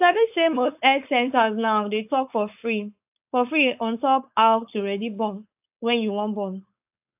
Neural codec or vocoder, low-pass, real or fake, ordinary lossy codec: codec, 16 kHz, 4.8 kbps, FACodec; 3.6 kHz; fake; AAC, 32 kbps